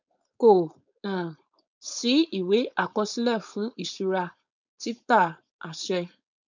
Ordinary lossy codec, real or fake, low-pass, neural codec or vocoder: none; fake; 7.2 kHz; codec, 16 kHz, 4.8 kbps, FACodec